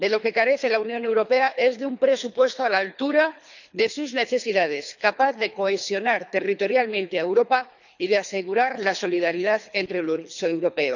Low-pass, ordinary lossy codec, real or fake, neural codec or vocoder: 7.2 kHz; none; fake; codec, 24 kHz, 3 kbps, HILCodec